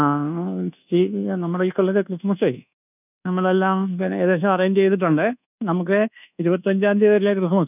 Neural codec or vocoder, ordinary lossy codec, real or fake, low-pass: codec, 24 kHz, 1.2 kbps, DualCodec; none; fake; 3.6 kHz